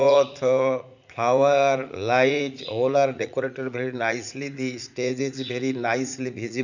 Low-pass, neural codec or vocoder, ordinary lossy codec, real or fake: 7.2 kHz; vocoder, 44.1 kHz, 80 mel bands, Vocos; none; fake